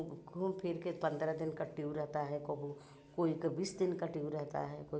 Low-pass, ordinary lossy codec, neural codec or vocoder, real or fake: none; none; none; real